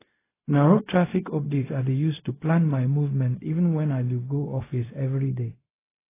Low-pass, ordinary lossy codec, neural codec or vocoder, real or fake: 3.6 kHz; AAC, 16 kbps; codec, 16 kHz, 0.4 kbps, LongCat-Audio-Codec; fake